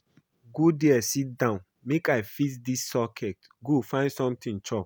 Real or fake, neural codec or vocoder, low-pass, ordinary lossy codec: real; none; none; none